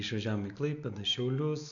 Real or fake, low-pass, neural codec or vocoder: real; 7.2 kHz; none